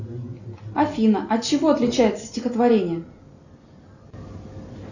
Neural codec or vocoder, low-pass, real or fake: none; 7.2 kHz; real